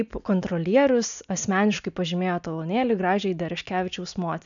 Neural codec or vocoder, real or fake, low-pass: none; real; 7.2 kHz